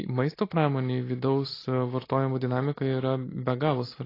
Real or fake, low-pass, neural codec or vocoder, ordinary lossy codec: real; 5.4 kHz; none; AAC, 24 kbps